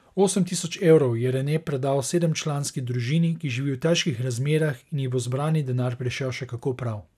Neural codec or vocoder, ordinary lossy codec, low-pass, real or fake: none; none; 14.4 kHz; real